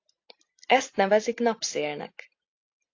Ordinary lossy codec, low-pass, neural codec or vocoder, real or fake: AAC, 48 kbps; 7.2 kHz; none; real